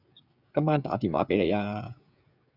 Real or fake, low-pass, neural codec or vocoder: fake; 5.4 kHz; codec, 16 kHz, 16 kbps, FreqCodec, smaller model